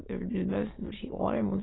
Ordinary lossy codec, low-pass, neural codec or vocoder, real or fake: AAC, 16 kbps; 7.2 kHz; autoencoder, 22.05 kHz, a latent of 192 numbers a frame, VITS, trained on many speakers; fake